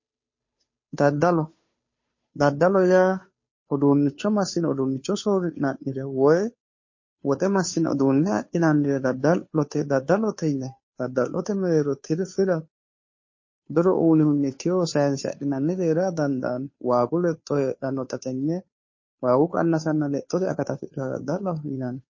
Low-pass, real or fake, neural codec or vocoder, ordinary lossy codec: 7.2 kHz; fake; codec, 16 kHz, 2 kbps, FunCodec, trained on Chinese and English, 25 frames a second; MP3, 32 kbps